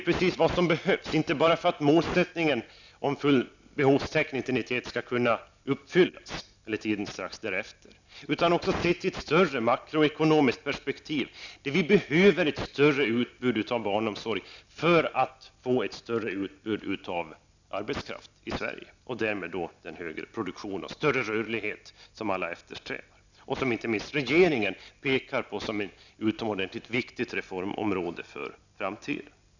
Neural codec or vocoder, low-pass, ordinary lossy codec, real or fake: none; 7.2 kHz; none; real